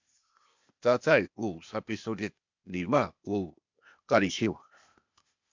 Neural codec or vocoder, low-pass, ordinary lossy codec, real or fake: codec, 16 kHz, 0.8 kbps, ZipCodec; 7.2 kHz; MP3, 64 kbps; fake